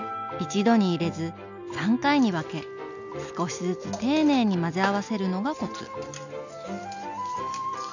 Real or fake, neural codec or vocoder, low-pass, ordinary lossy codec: real; none; 7.2 kHz; none